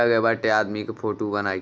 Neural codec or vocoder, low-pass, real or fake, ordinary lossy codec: none; none; real; none